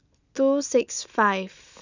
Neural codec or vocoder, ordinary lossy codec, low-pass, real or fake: none; none; 7.2 kHz; real